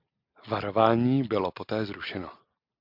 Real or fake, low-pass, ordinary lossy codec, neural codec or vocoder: real; 5.4 kHz; MP3, 48 kbps; none